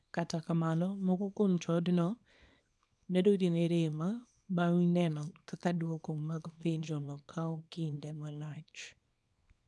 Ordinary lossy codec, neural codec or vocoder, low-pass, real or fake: none; codec, 24 kHz, 0.9 kbps, WavTokenizer, small release; none; fake